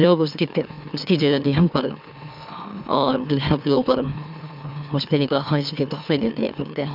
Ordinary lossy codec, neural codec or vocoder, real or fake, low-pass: none; autoencoder, 44.1 kHz, a latent of 192 numbers a frame, MeloTTS; fake; 5.4 kHz